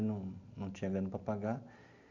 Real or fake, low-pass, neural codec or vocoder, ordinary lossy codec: real; 7.2 kHz; none; none